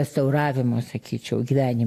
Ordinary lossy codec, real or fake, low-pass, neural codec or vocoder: AAC, 64 kbps; real; 14.4 kHz; none